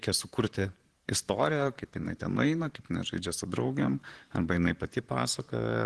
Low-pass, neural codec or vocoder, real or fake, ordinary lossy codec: 10.8 kHz; vocoder, 44.1 kHz, 128 mel bands, Pupu-Vocoder; fake; Opus, 16 kbps